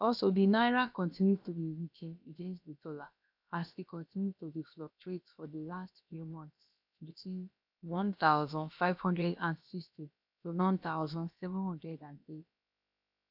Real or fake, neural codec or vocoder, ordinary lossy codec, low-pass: fake; codec, 16 kHz, about 1 kbps, DyCAST, with the encoder's durations; none; 5.4 kHz